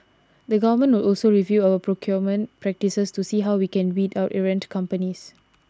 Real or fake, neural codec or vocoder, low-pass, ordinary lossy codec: real; none; none; none